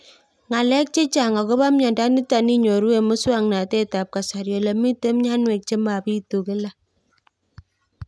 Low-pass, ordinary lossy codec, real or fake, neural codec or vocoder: none; none; real; none